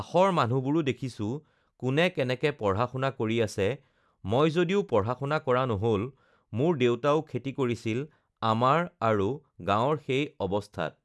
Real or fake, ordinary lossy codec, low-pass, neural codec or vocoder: real; none; none; none